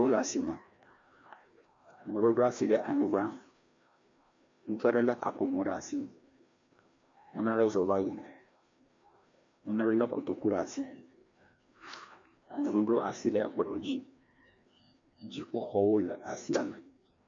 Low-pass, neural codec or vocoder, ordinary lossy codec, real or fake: 7.2 kHz; codec, 16 kHz, 1 kbps, FreqCodec, larger model; MP3, 48 kbps; fake